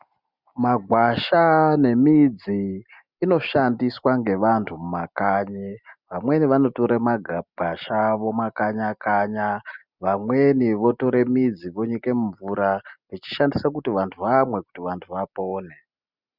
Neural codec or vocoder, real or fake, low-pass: none; real; 5.4 kHz